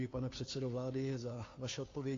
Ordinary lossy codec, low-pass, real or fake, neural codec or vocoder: AAC, 32 kbps; 7.2 kHz; fake; codec, 16 kHz, 2 kbps, FunCodec, trained on Chinese and English, 25 frames a second